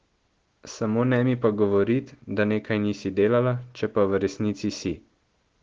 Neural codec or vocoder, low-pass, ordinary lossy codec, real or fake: none; 7.2 kHz; Opus, 16 kbps; real